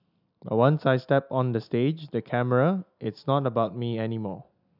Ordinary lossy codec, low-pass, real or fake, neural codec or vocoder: none; 5.4 kHz; real; none